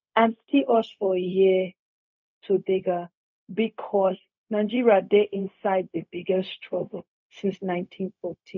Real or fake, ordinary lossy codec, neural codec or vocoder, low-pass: fake; none; codec, 16 kHz, 0.4 kbps, LongCat-Audio-Codec; 7.2 kHz